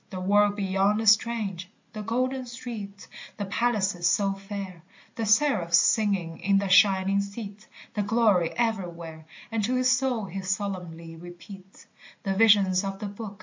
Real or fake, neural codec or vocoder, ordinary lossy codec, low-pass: real; none; MP3, 48 kbps; 7.2 kHz